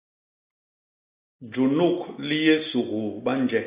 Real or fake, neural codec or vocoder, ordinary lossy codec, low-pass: real; none; Opus, 64 kbps; 3.6 kHz